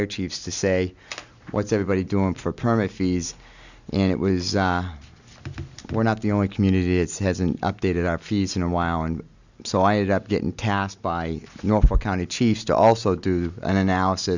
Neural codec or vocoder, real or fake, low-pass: none; real; 7.2 kHz